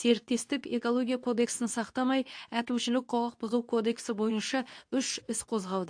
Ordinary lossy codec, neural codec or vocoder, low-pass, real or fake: AAC, 64 kbps; codec, 24 kHz, 0.9 kbps, WavTokenizer, medium speech release version 2; 9.9 kHz; fake